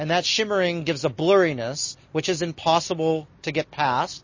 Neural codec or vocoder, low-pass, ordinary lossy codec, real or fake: none; 7.2 kHz; MP3, 32 kbps; real